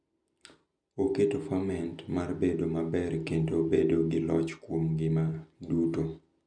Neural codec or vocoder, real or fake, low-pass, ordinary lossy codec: none; real; 9.9 kHz; MP3, 96 kbps